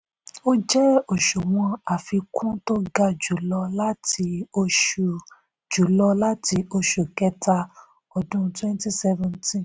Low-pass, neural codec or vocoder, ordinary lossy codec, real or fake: none; none; none; real